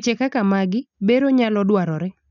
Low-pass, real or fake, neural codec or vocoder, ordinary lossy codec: 7.2 kHz; real; none; none